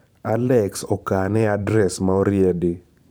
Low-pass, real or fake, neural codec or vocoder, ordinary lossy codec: none; fake; vocoder, 44.1 kHz, 128 mel bands every 512 samples, BigVGAN v2; none